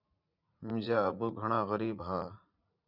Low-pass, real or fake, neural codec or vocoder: 5.4 kHz; fake; vocoder, 44.1 kHz, 80 mel bands, Vocos